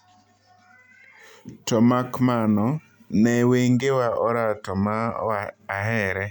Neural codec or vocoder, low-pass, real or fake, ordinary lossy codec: none; 19.8 kHz; real; none